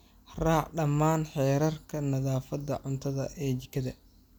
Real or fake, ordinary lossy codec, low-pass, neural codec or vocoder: real; none; none; none